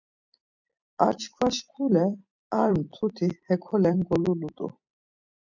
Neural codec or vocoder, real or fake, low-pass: vocoder, 44.1 kHz, 128 mel bands every 256 samples, BigVGAN v2; fake; 7.2 kHz